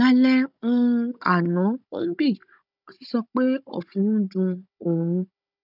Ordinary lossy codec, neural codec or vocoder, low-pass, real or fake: none; codec, 16 kHz, 16 kbps, FunCodec, trained on Chinese and English, 50 frames a second; 5.4 kHz; fake